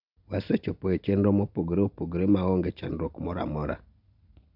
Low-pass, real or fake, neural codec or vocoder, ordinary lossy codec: 5.4 kHz; real; none; none